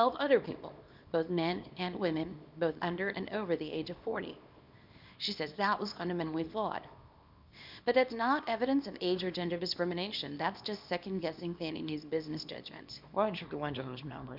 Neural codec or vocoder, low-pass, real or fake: codec, 24 kHz, 0.9 kbps, WavTokenizer, small release; 5.4 kHz; fake